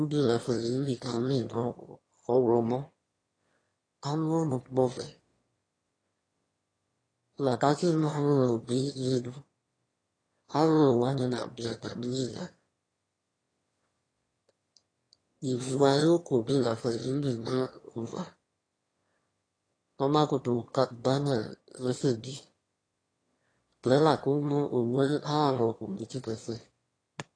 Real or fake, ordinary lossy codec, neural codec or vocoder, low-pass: fake; AAC, 32 kbps; autoencoder, 22.05 kHz, a latent of 192 numbers a frame, VITS, trained on one speaker; 9.9 kHz